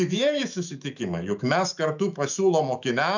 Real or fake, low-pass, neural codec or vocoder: fake; 7.2 kHz; autoencoder, 48 kHz, 128 numbers a frame, DAC-VAE, trained on Japanese speech